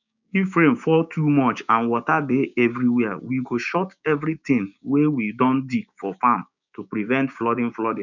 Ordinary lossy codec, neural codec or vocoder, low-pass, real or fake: none; codec, 16 kHz, 6 kbps, DAC; 7.2 kHz; fake